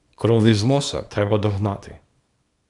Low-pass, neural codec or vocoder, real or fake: 10.8 kHz; codec, 24 kHz, 0.9 kbps, WavTokenizer, small release; fake